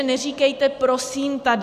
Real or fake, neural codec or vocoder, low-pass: real; none; 14.4 kHz